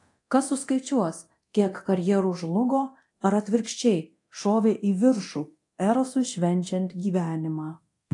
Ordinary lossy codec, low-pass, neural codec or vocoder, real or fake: AAC, 48 kbps; 10.8 kHz; codec, 24 kHz, 0.9 kbps, DualCodec; fake